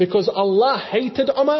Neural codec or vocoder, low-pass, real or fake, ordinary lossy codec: none; 7.2 kHz; real; MP3, 24 kbps